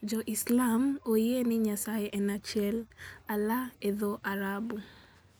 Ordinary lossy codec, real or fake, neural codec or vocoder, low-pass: none; real; none; none